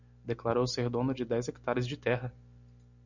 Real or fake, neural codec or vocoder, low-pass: real; none; 7.2 kHz